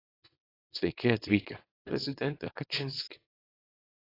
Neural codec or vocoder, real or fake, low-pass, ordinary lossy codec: codec, 24 kHz, 0.9 kbps, WavTokenizer, small release; fake; 5.4 kHz; AAC, 24 kbps